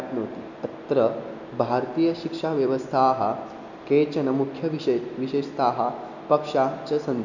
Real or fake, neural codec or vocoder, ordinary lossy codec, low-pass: real; none; none; 7.2 kHz